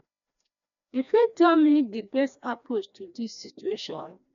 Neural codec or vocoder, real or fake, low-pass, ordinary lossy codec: codec, 16 kHz, 1 kbps, FreqCodec, larger model; fake; 7.2 kHz; none